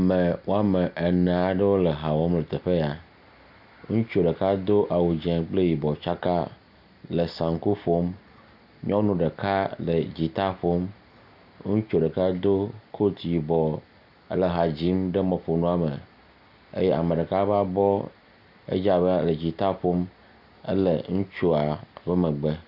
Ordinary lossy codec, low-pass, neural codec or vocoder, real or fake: Opus, 64 kbps; 5.4 kHz; none; real